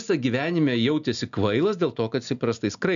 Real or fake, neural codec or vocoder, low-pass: real; none; 7.2 kHz